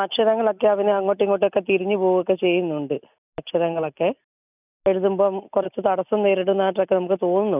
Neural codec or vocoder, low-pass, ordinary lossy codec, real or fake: none; 3.6 kHz; none; real